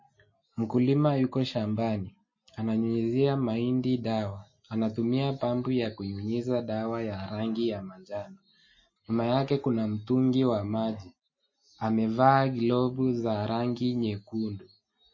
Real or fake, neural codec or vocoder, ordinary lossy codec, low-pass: real; none; MP3, 32 kbps; 7.2 kHz